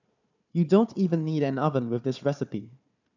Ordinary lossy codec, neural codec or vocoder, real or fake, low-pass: none; codec, 16 kHz, 4 kbps, FunCodec, trained on Chinese and English, 50 frames a second; fake; 7.2 kHz